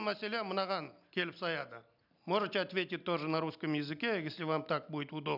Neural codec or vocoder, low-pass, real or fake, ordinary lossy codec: none; 5.4 kHz; real; none